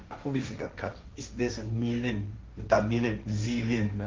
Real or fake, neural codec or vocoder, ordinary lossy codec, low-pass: fake; codec, 16 kHz, 1.1 kbps, Voila-Tokenizer; Opus, 32 kbps; 7.2 kHz